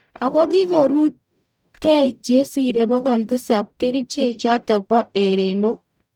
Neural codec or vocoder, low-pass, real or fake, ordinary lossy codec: codec, 44.1 kHz, 0.9 kbps, DAC; 19.8 kHz; fake; none